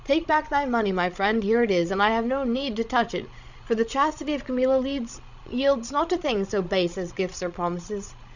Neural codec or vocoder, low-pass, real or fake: codec, 16 kHz, 16 kbps, FreqCodec, larger model; 7.2 kHz; fake